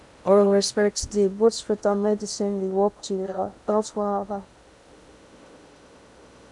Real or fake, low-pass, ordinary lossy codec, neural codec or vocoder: fake; 10.8 kHz; none; codec, 16 kHz in and 24 kHz out, 0.6 kbps, FocalCodec, streaming, 2048 codes